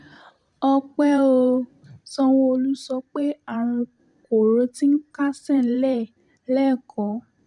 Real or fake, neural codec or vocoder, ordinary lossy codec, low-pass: fake; vocoder, 44.1 kHz, 128 mel bands every 512 samples, BigVGAN v2; MP3, 96 kbps; 10.8 kHz